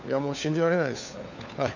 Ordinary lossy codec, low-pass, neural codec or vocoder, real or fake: none; 7.2 kHz; codec, 16 kHz, 4 kbps, FunCodec, trained on LibriTTS, 50 frames a second; fake